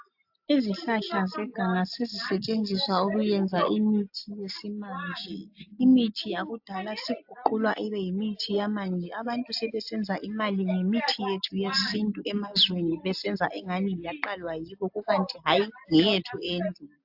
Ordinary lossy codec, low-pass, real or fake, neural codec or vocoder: AAC, 48 kbps; 5.4 kHz; real; none